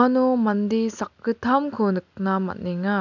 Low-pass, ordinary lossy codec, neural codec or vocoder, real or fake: 7.2 kHz; none; none; real